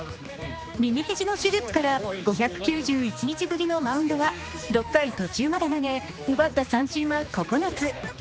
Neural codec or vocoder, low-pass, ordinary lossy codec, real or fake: codec, 16 kHz, 2 kbps, X-Codec, HuBERT features, trained on general audio; none; none; fake